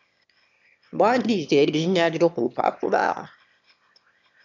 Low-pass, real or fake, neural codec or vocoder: 7.2 kHz; fake; autoencoder, 22.05 kHz, a latent of 192 numbers a frame, VITS, trained on one speaker